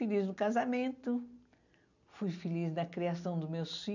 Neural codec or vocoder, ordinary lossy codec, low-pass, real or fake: none; none; 7.2 kHz; real